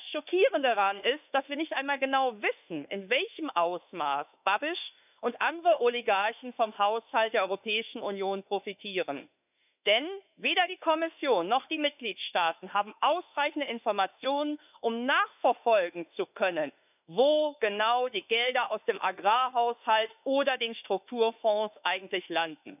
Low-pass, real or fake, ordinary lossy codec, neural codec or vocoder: 3.6 kHz; fake; none; autoencoder, 48 kHz, 32 numbers a frame, DAC-VAE, trained on Japanese speech